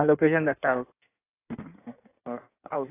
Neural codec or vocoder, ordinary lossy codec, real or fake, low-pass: codec, 16 kHz in and 24 kHz out, 1.1 kbps, FireRedTTS-2 codec; AAC, 16 kbps; fake; 3.6 kHz